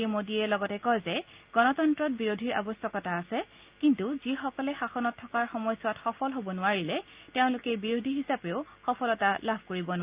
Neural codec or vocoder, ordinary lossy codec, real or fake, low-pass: none; Opus, 24 kbps; real; 3.6 kHz